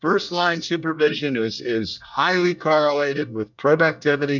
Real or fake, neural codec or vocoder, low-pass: fake; codec, 24 kHz, 1 kbps, SNAC; 7.2 kHz